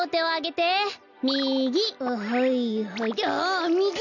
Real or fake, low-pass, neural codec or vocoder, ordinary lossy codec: real; 7.2 kHz; none; none